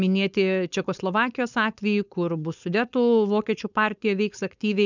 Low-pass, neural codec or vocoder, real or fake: 7.2 kHz; codec, 16 kHz, 4.8 kbps, FACodec; fake